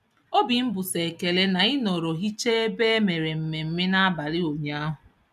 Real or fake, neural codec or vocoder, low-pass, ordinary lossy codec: real; none; 14.4 kHz; none